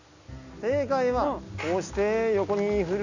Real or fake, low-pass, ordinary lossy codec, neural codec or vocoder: real; 7.2 kHz; none; none